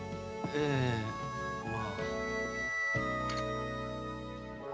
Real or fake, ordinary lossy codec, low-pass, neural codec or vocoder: real; none; none; none